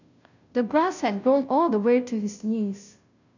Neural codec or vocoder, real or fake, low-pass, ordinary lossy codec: codec, 16 kHz, 0.5 kbps, FunCodec, trained on Chinese and English, 25 frames a second; fake; 7.2 kHz; none